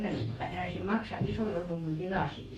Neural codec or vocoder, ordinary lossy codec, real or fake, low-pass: codec, 44.1 kHz, 2.6 kbps, DAC; MP3, 64 kbps; fake; 19.8 kHz